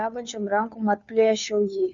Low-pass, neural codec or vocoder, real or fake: 7.2 kHz; codec, 16 kHz, 2 kbps, FunCodec, trained on Chinese and English, 25 frames a second; fake